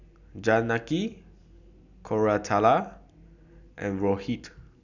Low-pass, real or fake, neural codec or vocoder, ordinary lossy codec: 7.2 kHz; real; none; none